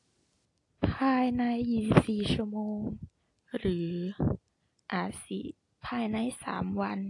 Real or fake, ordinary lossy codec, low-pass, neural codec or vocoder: real; AAC, 48 kbps; 10.8 kHz; none